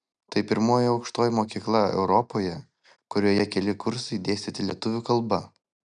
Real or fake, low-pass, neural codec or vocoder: real; 9.9 kHz; none